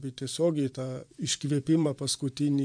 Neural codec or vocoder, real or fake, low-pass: vocoder, 24 kHz, 100 mel bands, Vocos; fake; 9.9 kHz